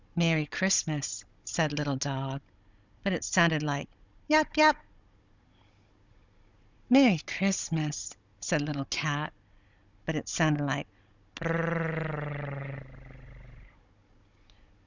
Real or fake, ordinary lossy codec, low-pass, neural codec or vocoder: fake; Opus, 64 kbps; 7.2 kHz; codec, 16 kHz, 16 kbps, FunCodec, trained on Chinese and English, 50 frames a second